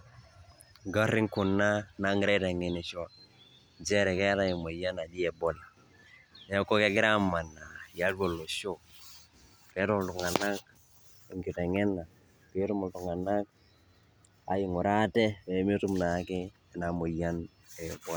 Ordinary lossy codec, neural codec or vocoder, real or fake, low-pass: none; none; real; none